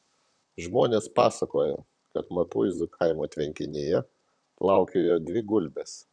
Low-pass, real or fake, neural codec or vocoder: 9.9 kHz; fake; vocoder, 44.1 kHz, 128 mel bands, Pupu-Vocoder